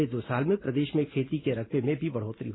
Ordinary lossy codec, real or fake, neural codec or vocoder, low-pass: AAC, 16 kbps; real; none; 7.2 kHz